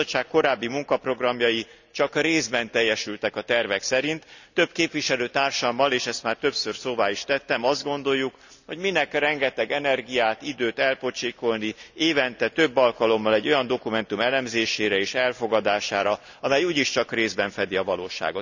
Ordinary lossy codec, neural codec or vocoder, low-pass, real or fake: none; none; 7.2 kHz; real